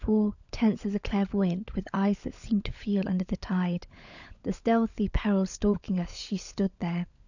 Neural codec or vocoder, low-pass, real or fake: codec, 16 kHz, 16 kbps, FunCodec, trained on LibriTTS, 50 frames a second; 7.2 kHz; fake